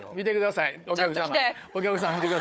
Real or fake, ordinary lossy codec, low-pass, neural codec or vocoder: fake; none; none; codec, 16 kHz, 4 kbps, FunCodec, trained on Chinese and English, 50 frames a second